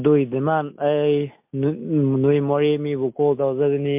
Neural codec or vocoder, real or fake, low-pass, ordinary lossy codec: none; real; 3.6 kHz; MP3, 32 kbps